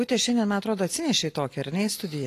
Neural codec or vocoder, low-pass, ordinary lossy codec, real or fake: none; 14.4 kHz; AAC, 48 kbps; real